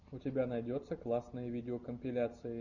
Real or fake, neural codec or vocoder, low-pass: real; none; 7.2 kHz